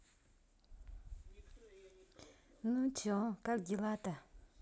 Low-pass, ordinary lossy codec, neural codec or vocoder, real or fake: none; none; none; real